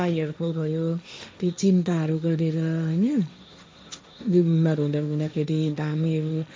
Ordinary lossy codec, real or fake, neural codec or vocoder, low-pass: none; fake; codec, 16 kHz, 1.1 kbps, Voila-Tokenizer; none